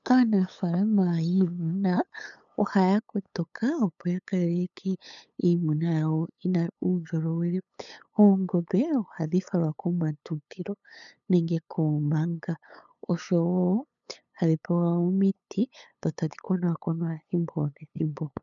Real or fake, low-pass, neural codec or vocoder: fake; 7.2 kHz; codec, 16 kHz, 8 kbps, FunCodec, trained on LibriTTS, 25 frames a second